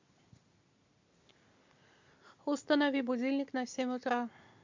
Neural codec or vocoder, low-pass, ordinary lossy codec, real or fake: none; 7.2 kHz; MP3, 64 kbps; real